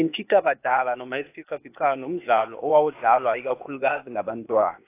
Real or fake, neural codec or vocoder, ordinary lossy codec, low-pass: fake; codec, 16 kHz, 0.8 kbps, ZipCodec; AAC, 24 kbps; 3.6 kHz